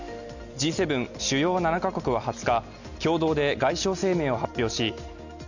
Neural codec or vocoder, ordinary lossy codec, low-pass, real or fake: none; none; 7.2 kHz; real